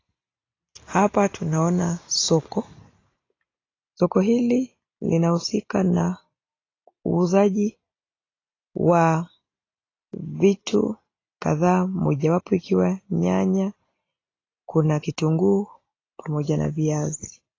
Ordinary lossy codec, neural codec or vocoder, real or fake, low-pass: AAC, 32 kbps; none; real; 7.2 kHz